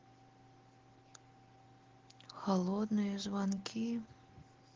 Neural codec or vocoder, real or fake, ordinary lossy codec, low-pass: none; real; Opus, 16 kbps; 7.2 kHz